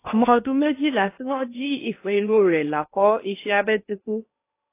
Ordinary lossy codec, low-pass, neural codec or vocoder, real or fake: AAC, 24 kbps; 3.6 kHz; codec, 16 kHz in and 24 kHz out, 0.8 kbps, FocalCodec, streaming, 65536 codes; fake